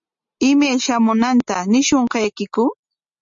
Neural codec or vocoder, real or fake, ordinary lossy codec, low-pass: none; real; MP3, 48 kbps; 7.2 kHz